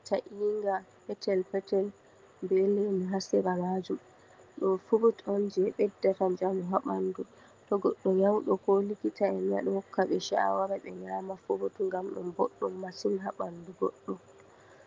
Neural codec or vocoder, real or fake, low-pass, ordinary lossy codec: none; real; 7.2 kHz; Opus, 32 kbps